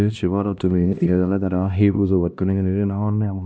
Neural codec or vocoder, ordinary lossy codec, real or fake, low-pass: codec, 16 kHz, 1 kbps, X-Codec, HuBERT features, trained on LibriSpeech; none; fake; none